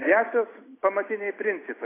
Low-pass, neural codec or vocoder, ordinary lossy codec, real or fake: 3.6 kHz; none; AAC, 16 kbps; real